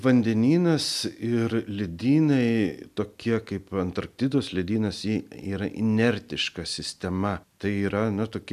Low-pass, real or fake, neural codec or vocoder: 14.4 kHz; real; none